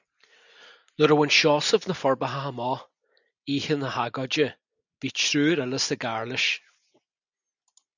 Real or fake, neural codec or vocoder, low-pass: real; none; 7.2 kHz